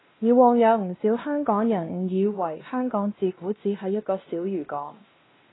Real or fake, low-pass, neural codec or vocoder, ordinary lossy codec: fake; 7.2 kHz; codec, 16 kHz, 1 kbps, X-Codec, WavLM features, trained on Multilingual LibriSpeech; AAC, 16 kbps